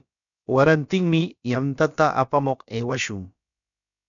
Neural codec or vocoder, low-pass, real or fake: codec, 16 kHz, about 1 kbps, DyCAST, with the encoder's durations; 7.2 kHz; fake